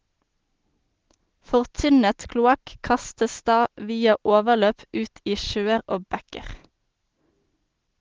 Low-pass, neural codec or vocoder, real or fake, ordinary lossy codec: 7.2 kHz; none; real; Opus, 16 kbps